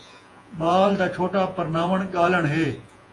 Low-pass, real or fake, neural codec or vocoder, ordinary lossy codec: 10.8 kHz; fake; vocoder, 48 kHz, 128 mel bands, Vocos; AAC, 48 kbps